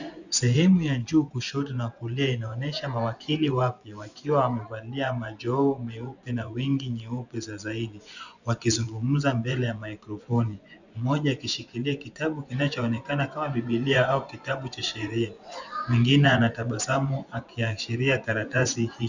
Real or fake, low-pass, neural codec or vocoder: fake; 7.2 kHz; vocoder, 24 kHz, 100 mel bands, Vocos